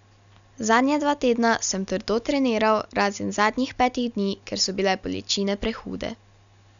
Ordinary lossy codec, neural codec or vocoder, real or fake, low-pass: none; none; real; 7.2 kHz